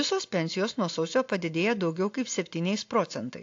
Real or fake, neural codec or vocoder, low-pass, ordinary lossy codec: real; none; 7.2 kHz; AAC, 48 kbps